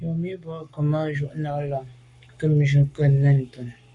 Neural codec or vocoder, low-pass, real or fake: codec, 44.1 kHz, 7.8 kbps, Pupu-Codec; 10.8 kHz; fake